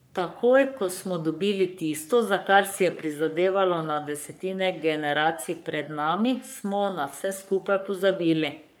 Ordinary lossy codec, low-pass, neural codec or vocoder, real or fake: none; none; codec, 44.1 kHz, 3.4 kbps, Pupu-Codec; fake